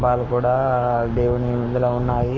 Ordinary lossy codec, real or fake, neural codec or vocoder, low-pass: none; fake; codec, 44.1 kHz, 7.8 kbps, Pupu-Codec; 7.2 kHz